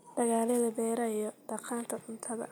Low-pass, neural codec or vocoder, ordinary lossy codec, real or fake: none; none; none; real